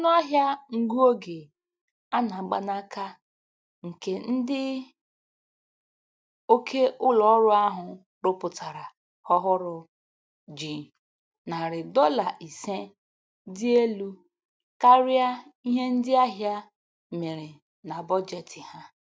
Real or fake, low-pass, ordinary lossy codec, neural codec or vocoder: real; none; none; none